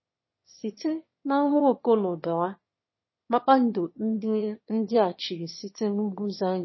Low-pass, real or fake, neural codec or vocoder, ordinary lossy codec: 7.2 kHz; fake; autoencoder, 22.05 kHz, a latent of 192 numbers a frame, VITS, trained on one speaker; MP3, 24 kbps